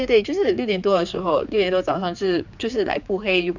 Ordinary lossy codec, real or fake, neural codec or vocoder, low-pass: none; fake; codec, 16 kHz, 4 kbps, X-Codec, HuBERT features, trained on general audio; 7.2 kHz